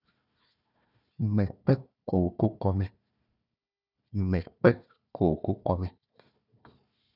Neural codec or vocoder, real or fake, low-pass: codec, 16 kHz, 1 kbps, FunCodec, trained on Chinese and English, 50 frames a second; fake; 5.4 kHz